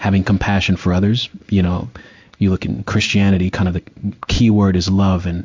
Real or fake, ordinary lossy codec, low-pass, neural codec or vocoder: fake; MP3, 64 kbps; 7.2 kHz; codec, 16 kHz in and 24 kHz out, 1 kbps, XY-Tokenizer